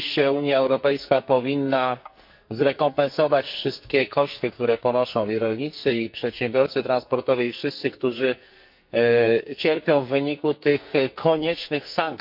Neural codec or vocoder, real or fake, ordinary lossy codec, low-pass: codec, 44.1 kHz, 2.6 kbps, SNAC; fake; MP3, 48 kbps; 5.4 kHz